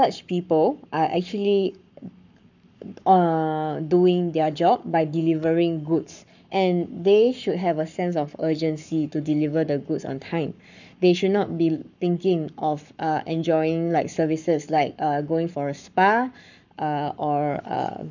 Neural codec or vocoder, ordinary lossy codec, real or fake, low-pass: codec, 44.1 kHz, 7.8 kbps, Pupu-Codec; none; fake; 7.2 kHz